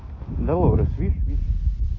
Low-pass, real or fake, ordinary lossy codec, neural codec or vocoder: 7.2 kHz; real; AAC, 32 kbps; none